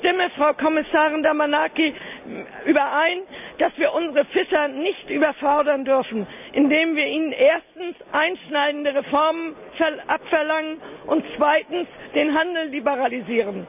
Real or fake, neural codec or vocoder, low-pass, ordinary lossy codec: real; none; 3.6 kHz; none